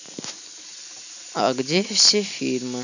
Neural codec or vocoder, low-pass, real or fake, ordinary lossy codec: none; 7.2 kHz; real; none